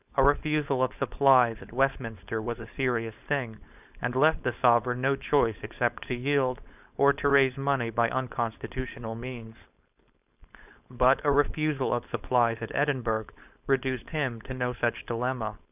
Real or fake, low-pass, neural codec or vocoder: fake; 3.6 kHz; codec, 16 kHz, 4.8 kbps, FACodec